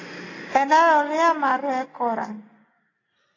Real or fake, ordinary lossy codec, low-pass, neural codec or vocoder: real; AAC, 32 kbps; 7.2 kHz; none